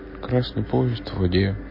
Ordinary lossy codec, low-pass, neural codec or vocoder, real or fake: MP3, 24 kbps; 5.4 kHz; none; real